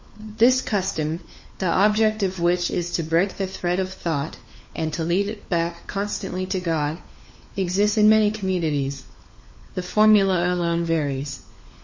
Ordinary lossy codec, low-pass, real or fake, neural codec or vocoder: MP3, 32 kbps; 7.2 kHz; fake; codec, 16 kHz, 4 kbps, FunCodec, trained on LibriTTS, 50 frames a second